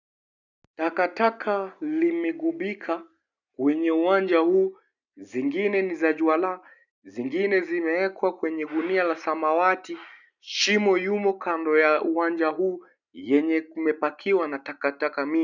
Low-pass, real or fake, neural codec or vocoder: 7.2 kHz; real; none